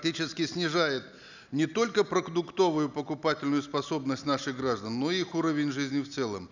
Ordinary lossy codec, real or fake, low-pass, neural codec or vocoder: none; real; 7.2 kHz; none